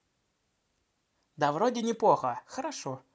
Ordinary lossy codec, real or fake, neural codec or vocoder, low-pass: none; real; none; none